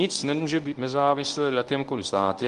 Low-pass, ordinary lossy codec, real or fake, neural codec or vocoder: 10.8 kHz; Opus, 24 kbps; fake; codec, 24 kHz, 0.9 kbps, WavTokenizer, medium speech release version 1